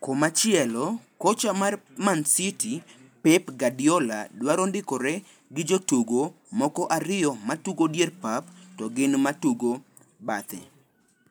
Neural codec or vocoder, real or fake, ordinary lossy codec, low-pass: none; real; none; none